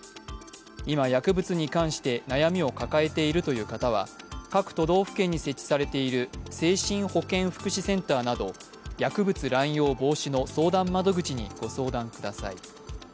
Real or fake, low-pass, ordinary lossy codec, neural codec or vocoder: real; none; none; none